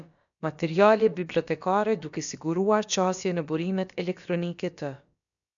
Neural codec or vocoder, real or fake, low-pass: codec, 16 kHz, about 1 kbps, DyCAST, with the encoder's durations; fake; 7.2 kHz